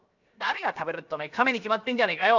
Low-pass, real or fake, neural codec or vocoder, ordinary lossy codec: 7.2 kHz; fake; codec, 16 kHz, 0.7 kbps, FocalCodec; none